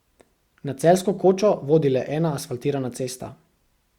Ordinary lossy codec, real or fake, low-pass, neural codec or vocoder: Opus, 64 kbps; real; 19.8 kHz; none